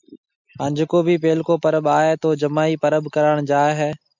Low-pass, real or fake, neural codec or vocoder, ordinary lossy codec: 7.2 kHz; real; none; MP3, 64 kbps